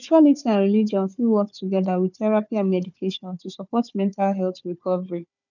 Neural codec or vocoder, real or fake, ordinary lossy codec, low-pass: codec, 16 kHz, 4 kbps, FunCodec, trained on Chinese and English, 50 frames a second; fake; none; 7.2 kHz